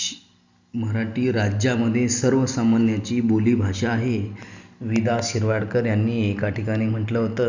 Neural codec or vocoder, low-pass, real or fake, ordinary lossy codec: none; 7.2 kHz; real; Opus, 64 kbps